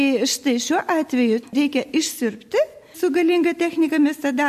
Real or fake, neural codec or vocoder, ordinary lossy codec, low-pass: real; none; MP3, 64 kbps; 14.4 kHz